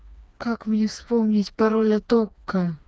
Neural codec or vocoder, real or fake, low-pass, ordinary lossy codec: codec, 16 kHz, 2 kbps, FreqCodec, smaller model; fake; none; none